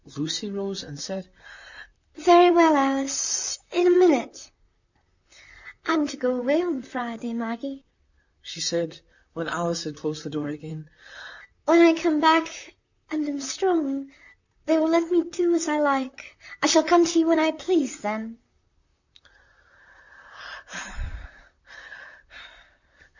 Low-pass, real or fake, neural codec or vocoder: 7.2 kHz; fake; vocoder, 44.1 kHz, 128 mel bands, Pupu-Vocoder